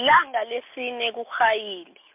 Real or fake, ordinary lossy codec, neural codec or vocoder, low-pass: real; MP3, 32 kbps; none; 3.6 kHz